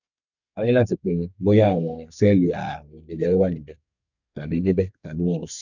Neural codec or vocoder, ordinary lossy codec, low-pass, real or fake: codec, 32 kHz, 1.9 kbps, SNAC; none; 7.2 kHz; fake